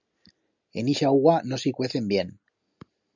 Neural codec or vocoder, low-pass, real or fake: none; 7.2 kHz; real